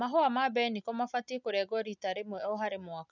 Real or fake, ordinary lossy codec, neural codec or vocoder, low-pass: real; none; none; 7.2 kHz